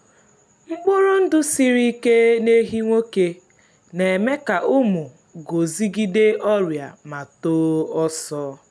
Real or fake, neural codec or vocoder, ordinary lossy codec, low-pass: real; none; none; none